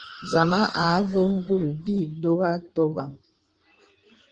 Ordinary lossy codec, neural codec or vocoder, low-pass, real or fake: Opus, 24 kbps; codec, 16 kHz in and 24 kHz out, 1.1 kbps, FireRedTTS-2 codec; 9.9 kHz; fake